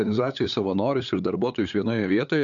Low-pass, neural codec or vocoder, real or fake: 7.2 kHz; codec, 16 kHz, 8 kbps, FunCodec, trained on LibriTTS, 25 frames a second; fake